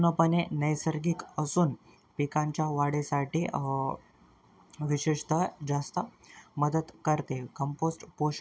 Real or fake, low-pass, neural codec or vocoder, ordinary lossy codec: real; none; none; none